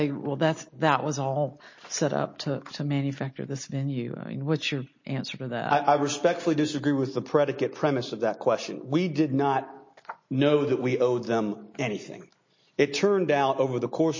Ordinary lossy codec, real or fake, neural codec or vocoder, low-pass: MP3, 32 kbps; real; none; 7.2 kHz